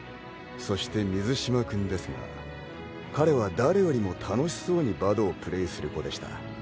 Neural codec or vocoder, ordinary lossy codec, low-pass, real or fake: none; none; none; real